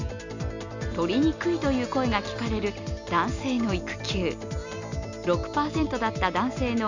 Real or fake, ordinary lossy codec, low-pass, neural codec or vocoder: real; none; 7.2 kHz; none